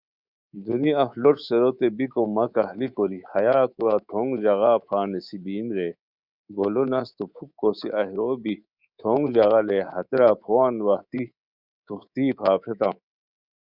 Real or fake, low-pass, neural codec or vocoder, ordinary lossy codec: fake; 5.4 kHz; codec, 24 kHz, 3.1 kbps, DualCodec; Opus, 64 kbps